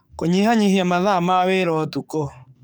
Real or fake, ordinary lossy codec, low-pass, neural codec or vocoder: fake; none; none; codec, 44.1 kHz, 7.8 kbps, DAC